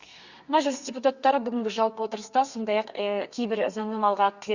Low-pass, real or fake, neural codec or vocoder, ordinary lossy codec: 7.2 kHz; fake; codec, 32 kHz, 1.9 kbps, SNAC; Opus, 64 kbps